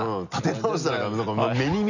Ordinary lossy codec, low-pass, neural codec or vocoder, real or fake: none; 7.2 kHz; none; real